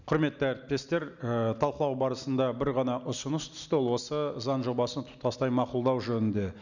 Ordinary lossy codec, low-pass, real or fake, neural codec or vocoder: none; 7.2 kHz; real; none